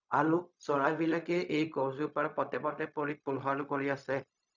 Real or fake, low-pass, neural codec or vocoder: fake; 7.2 kHz; codec, 16 kHz, 0.4 kbps, LongCat-Audio-Codec